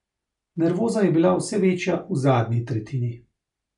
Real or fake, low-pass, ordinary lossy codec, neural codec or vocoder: real; 10.8 kHz; none; none